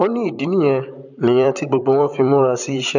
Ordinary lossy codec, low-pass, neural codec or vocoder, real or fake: none; 7.2 kHz; vocoder, 44.1 kHz, 128 mel bands, Pupu-Vocoder; fake